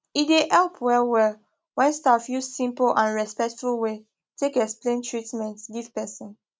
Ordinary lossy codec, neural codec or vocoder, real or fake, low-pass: none; none; real; none